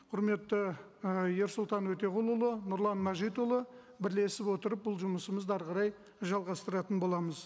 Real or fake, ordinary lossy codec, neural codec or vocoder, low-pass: real; none; none; none